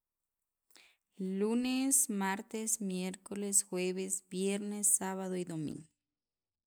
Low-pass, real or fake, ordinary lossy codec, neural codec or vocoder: none; real; none; none